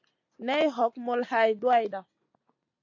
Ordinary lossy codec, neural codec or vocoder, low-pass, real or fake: AAC, 48 kbps; none; 7.2 kHz; real